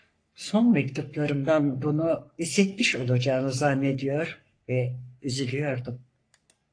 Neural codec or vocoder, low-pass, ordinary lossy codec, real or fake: codec, 44.1 kHz, 3.4 kbps, Pupu-Codec; 9.9 kHz; AAC, 48 kbps; fake